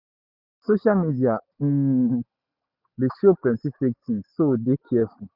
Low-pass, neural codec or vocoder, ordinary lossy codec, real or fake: 5.4 kHz; none; none; real